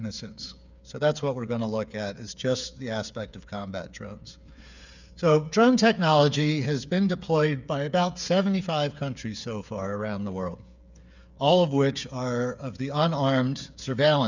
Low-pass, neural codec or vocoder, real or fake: 7.2 kHz; codec, 16 kHz, 8 kbps, FreqCodec, smaller model; fake